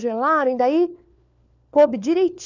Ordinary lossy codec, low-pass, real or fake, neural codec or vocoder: none; 7.2 kHz; fake; codec, 16 kHz, 4 kbps, FunCodec, trained on LibriTTS, 50 frames a second